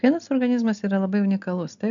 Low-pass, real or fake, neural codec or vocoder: 7.2 kHz; real; none